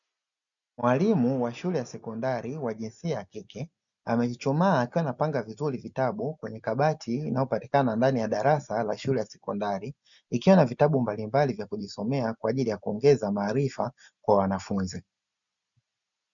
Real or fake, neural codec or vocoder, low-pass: real; none; 7.2 kHz